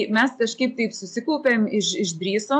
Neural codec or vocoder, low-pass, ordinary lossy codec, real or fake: none; 9.9 kHz; AAC, 64 kbps; real